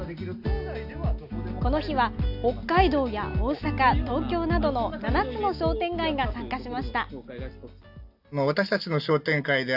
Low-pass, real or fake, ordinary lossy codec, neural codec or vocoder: 5.4 kHz; real; AAC, 48 kbps; none